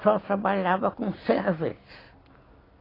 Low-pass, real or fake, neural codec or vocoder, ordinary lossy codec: 5.4 kHz; real; none; AAC, 24 kbps